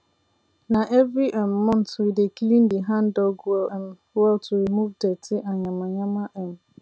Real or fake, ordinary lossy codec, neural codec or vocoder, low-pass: real; none; none; none